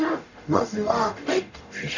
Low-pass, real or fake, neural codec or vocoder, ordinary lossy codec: 7.2 kHz; fake; codec, 44.1 kHz, 0.9 kbps, DAC; none